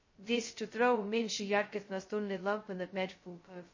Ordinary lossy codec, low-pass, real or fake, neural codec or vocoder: MP3, 32 kbps; 7.2 kHz; fake; codec, 16 kHz, 0.2 kbps, FocalCodec